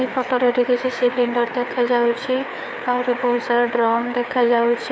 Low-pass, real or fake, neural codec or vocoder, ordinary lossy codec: none; fake; codec, 16 kHz, 4 kbps, FreqCodec, larger model; none